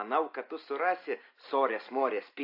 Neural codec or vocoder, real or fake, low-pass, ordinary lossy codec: none; real; 5.4 kHz; AAC, 32 kbps